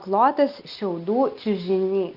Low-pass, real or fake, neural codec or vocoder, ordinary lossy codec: 5.4 kHz; real; none; Opus, 24 kbps